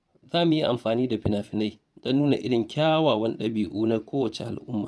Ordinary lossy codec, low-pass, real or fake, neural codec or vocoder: none; none; fake; vocoder, 22.05 kHz, 80 mel bands, Vocos